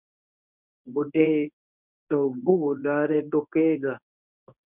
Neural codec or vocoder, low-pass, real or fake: codec, 24 kHz, 0.9 kbps, WavTokenizer, medium speech release version 1; 3.6 kHz; fake